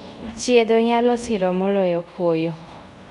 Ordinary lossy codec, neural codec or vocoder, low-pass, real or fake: none; codec, 24 kHz, 0.5 kbps, DualCodec; 10.8 kHz; fake